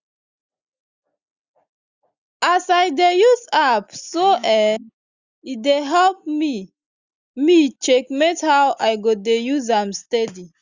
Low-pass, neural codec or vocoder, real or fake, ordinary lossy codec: 7.2 kHz; none; real; Opus, 64 kbps